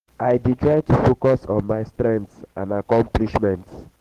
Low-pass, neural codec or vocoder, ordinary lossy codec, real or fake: 14.4 kHz; codec, 44.1 kHz, 7.8 kbps, DAC; Opus, 16 kbps; fake